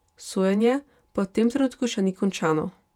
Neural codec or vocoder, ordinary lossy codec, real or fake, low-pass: vocoder, 48 kHz, 128 mel bands, Vocos; none; fake; 19.8 kHz